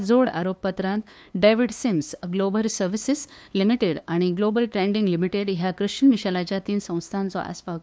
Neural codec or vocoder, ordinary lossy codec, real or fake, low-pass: codec, 16 kHz, 2 kbps, FunCodec, trained on LibriTTS, 25 frames a second; none; fake; none